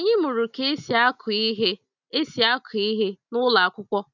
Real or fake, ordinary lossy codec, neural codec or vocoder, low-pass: real; none; none; 7.2 kHz